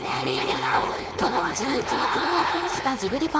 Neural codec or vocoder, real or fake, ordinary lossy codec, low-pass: codec, 16 kHz, 4.8 kbps, FACodec; fake; none; none